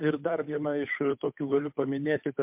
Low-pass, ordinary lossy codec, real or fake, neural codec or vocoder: 3.6 kHz; MP3, 32 kbps; fake; codec, 16 kHz, 8 kbps, FunCodec, trained on Chinese and English, 25 frames a second